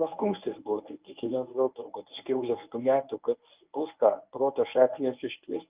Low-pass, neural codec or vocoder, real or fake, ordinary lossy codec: 3.6 kHz; codec, 24 kHz, 0.9 kbps, WavTokenizer, medium speech release version 1; fake; Opus, 24 kbps